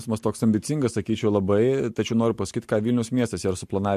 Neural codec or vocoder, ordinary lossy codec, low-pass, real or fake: none; MP3, 64 kbps; 14.4 kHz; real